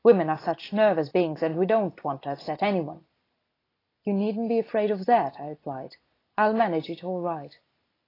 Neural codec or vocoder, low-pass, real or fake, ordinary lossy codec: none; 5.4 kHz; real; AAC, 24 kbps